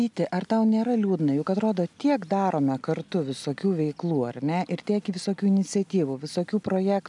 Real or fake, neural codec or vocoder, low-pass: real; none; 10.8 kHz